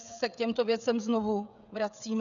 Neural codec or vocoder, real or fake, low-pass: codec, 16 kHz, 16 kbps, FreqCodec, smaller model; fake; 7.2 kHz